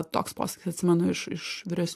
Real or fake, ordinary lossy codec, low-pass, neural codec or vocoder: real; AAC, 64 kbps; 14.4 kHz; none